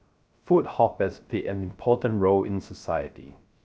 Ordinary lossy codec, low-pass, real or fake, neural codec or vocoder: none; none; fake; codec, 16 kHz, 0.3 kbps, FocalCodec